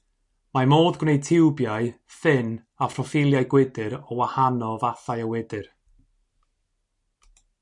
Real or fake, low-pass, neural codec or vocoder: real; 10.8 kHz; none